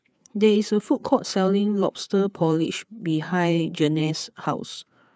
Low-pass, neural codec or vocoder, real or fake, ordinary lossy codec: none; codec, 16 kHz, 4 kbps, FreqCodec, larger model; fake; none